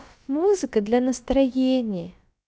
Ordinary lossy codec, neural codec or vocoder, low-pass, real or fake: none; codec, 16 kHz, about 1 kbps, DyCAST, with the encoder's durations; none; fake